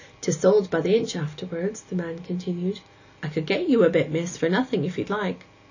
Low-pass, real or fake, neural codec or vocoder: 7.2 kHz; real; none